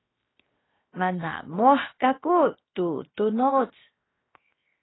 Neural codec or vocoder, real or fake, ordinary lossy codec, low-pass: codec, 16 kHz, 0.7 kbps, FocalCodec; fake; AAC, 16 kbps; 7.2 kHz